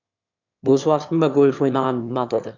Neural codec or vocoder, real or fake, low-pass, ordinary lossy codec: autoencoder, 22.05 kHz, a latent of 192 numbers a frame, VITS, trained on one speaker; fake; 7.2 kHz; none